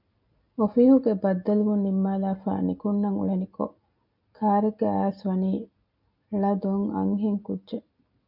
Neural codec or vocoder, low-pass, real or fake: none; 5.4 kHz; real